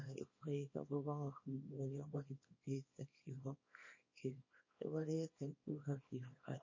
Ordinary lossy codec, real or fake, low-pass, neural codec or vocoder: MP3, 32 kbps; fake; 7.2 kHz; codec, 24 kHz, 0.9 kbps, WavTokenizer, small release